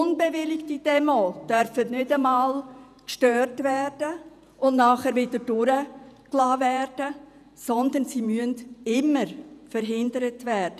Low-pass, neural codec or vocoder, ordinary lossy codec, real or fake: 14.4 kHz; vocoder, 48 kHz, 128 mel bands, Vocos; none; fake